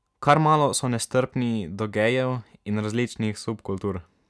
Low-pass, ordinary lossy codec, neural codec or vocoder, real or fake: none; none; none; real